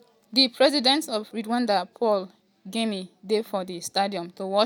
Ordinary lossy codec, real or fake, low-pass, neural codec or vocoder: none; real; none; none